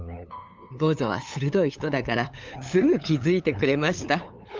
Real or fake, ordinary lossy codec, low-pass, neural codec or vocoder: fake; Opus, 32 kbps; 7.2 kHz; codec, 16 kHz, 8 kbps, FunCodec, trained on LibriTTS, 25 frames a second